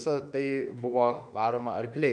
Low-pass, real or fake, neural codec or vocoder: 9.9 kHz; fake; autoencoder, 48 kHz, 32 numbers a frame, DAC-VAE, trained on Japanese speech